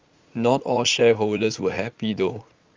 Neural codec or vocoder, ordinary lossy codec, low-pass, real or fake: vocoder, 44.1 kHz, 80 mel bands, Vocos; Opus, 32 kbps; 7.2 kHz; fake